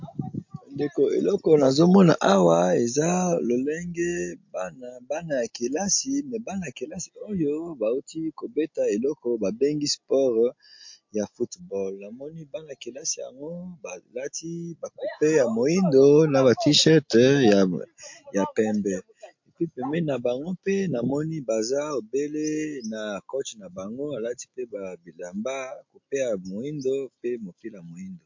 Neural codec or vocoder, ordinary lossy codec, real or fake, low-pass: none; MP3, 48 kbps; real; 7.2 kHz